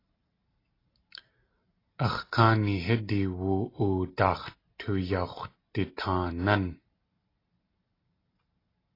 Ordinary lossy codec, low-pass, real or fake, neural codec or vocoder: AAC, 24 kbps; 5.4 kHz; real; none